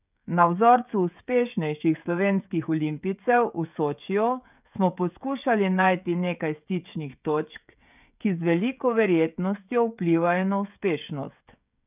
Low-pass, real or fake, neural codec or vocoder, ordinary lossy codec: 3.6 kHz; fake; codec, 16 kHz, 16 kbps, FreqCodec, smaller model; none